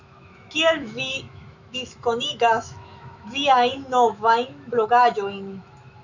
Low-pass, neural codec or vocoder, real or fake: 7.2 kHz; autoencoder, 48 kHz, 128 numbers a frame, DAC-VAE, trained on Japanese speech; fake